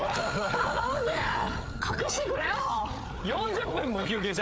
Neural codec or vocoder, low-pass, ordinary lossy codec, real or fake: codec, 16 kHz, 8 kbps, FreqCodec, larger model; none; none; fake